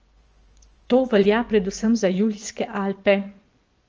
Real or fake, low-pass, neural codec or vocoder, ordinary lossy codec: fake; 7.2 kHz; vocoder, 24 kHz, 100 mel bands, Vocos; Opus, 24 kbps